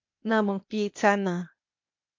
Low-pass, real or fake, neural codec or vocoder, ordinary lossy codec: 7.2 kHz; fake; codec, 16 kHz, 0.8 kbps, ZipCodec; MP3, 48 kbps